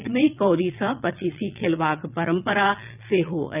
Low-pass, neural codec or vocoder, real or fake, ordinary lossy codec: 3.6 kHz; vocoder, 22.05 kHz, 80 mel bands, Vocos; fake; none